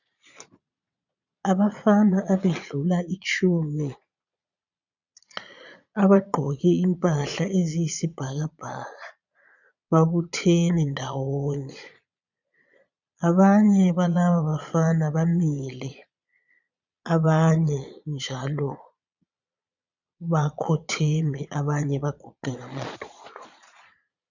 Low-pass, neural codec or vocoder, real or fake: 7.2 kHz; vocoder, 22.05 kHz, 80 mel bands, Vocos; fake